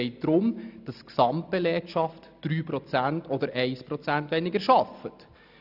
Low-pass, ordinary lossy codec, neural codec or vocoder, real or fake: 5.4 kHz; none; none; real